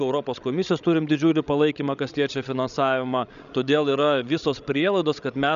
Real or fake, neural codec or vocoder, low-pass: fake; codec, 16 kHz, 16 kbps, FreqCodec, larger model; 7.2 kHz